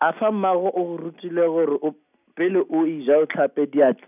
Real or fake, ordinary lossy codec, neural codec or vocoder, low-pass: real; none; none; 3.6 kHz